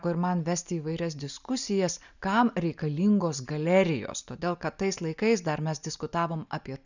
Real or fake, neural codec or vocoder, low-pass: real; none; 7.2 kHz